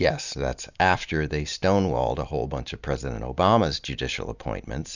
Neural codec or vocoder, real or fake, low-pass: none; real; 7.2 kHz